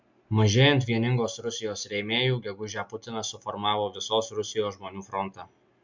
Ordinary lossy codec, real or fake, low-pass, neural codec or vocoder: MP3, 64 kbps; real; 7.2 kHz; none